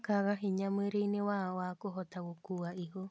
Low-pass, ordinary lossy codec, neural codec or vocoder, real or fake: none; none; none; real